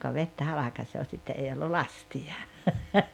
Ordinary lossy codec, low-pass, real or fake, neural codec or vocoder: none; 19.8 kHz; real; none